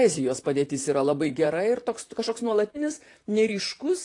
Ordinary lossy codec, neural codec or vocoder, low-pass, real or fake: AAC, 48 kbps; vocoder, 44.1 kHz, 128 mel bands, Pupu-Vocoder; 10.8 kHz; fake